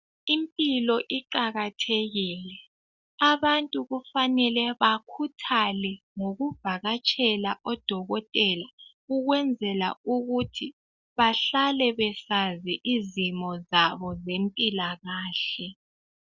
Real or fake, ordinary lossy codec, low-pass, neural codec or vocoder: real; Opus, 64 kbps; 7.2 kHz; none